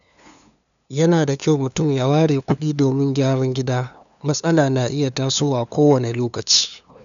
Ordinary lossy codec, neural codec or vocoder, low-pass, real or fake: none; codec, 16 kHz, 2 kbps, FunCodec, trained on LibriTTS, 25 frames a second; 7.2 kHz; fake